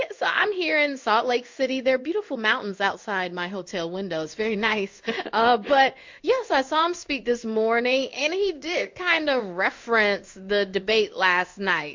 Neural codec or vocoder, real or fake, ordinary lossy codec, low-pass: codec, 16 kHz in and 24 kHz out, 1 kbps, XY-Tokenizer; fake; MP3, 48 kbps; 7.2 kHz